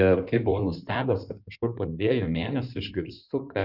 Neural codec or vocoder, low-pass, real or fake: codec, 16 kHz in and 24 kHz out, 2.2 kbps, FireRedTTS-2 codec; 5.4 kHz; fake